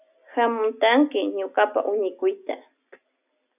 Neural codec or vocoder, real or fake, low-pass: none; real; 3.6 kHz